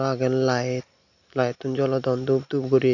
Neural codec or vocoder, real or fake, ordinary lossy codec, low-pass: none; real; none; 7.2 kHz